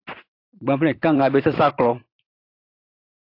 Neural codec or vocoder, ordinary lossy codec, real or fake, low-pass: vocoder, 44.1 kHz, 128 mel bands every 512 samples, BigVGAN v2; AAC, 32 kbps; fake; 5.4 kHz